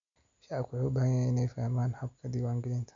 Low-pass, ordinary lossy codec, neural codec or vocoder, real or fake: 7.2 kHz; AAC, 48 kbps; none; real